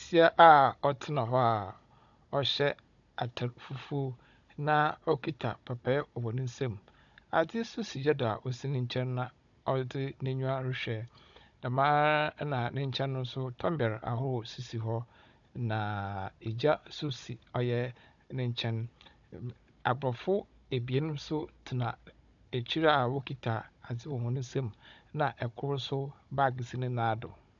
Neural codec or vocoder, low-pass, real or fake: codec, 16 kHz, 16 kbps, FunCodec, trained on Chinese and English, 50 frames a second; 7.2 kHz; fake